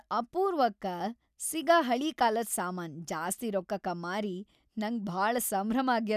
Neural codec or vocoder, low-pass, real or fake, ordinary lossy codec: none; 14.4 kHz; real; none